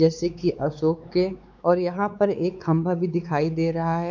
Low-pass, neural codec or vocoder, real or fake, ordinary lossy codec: none; codec, 16 kHz, 4 kbps, X-Codec, WavLM features, trained on Multilingual LibriSpeech; fake; none